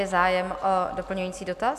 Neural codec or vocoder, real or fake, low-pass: none; real; 14.4 kHz